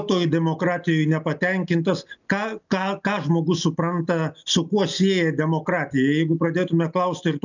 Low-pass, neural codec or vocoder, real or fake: 7.2 kHz; none; real